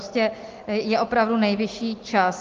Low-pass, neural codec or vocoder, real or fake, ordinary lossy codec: 7.2 kHz; none; real; Opus, 24 kbps